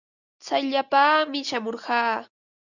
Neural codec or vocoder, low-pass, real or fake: none; 7.2 kHz; real